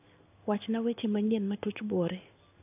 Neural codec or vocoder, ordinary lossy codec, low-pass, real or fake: codec, 16 kHz in and 24 kHz out, 1 kbps, XY-Tokenizer; AAC, 24 kbps; 3.6 kHz; fake